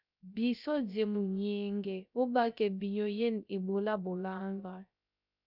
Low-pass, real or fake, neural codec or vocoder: 5.4 kHz; fake; codec, 16 kHz, 0.3 kbps, FocalCodec